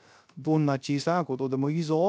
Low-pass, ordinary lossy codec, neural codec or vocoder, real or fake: none; none; codec, 16 kHz, 0.3 kbps, FocalCodec; fake